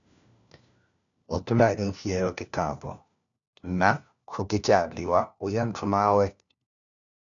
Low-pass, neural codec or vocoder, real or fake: 7.2 kHz; codec, 16 kHz, 1 kbps, FunCodec, trained on LibriTTS, 50 frames a second; fake